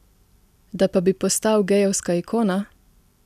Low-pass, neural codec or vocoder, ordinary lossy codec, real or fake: 14.4 kHz; none; none; real